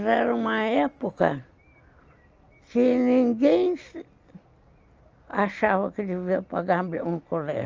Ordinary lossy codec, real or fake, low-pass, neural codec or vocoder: Opus, 24 kbps; real; 7.2 kHz; none